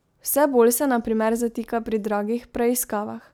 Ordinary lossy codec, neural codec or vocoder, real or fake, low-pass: none; none; real; none